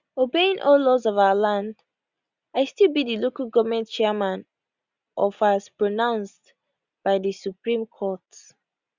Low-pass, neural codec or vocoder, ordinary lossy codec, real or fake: 7.2 kHz; none; Opus, 64 kbps; real